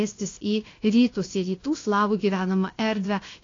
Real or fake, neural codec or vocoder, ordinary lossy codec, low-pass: fake; codec, 16 kHz, about 1 kbps, DyCAST, with the encoder's durations; AAC, 32 kbps; 7.2 kHz